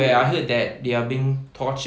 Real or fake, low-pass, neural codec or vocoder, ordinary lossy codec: real; none; none; none